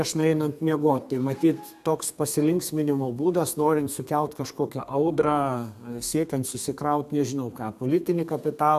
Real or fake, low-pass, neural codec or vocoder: fake; 14.4 kHz; codec, 32 kHz, 1.9 kbps, SNAC